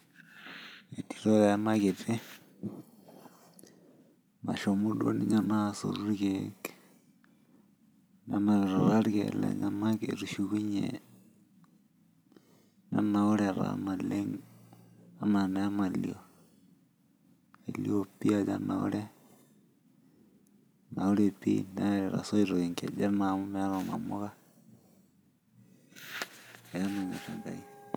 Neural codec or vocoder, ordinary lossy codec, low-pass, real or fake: none; none; none; real